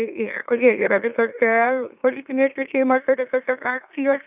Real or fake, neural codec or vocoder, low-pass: fake; autoencoder, 44.1 kHz, a latent of 192 numbers a frame, MeloTTS; 3.6 kHz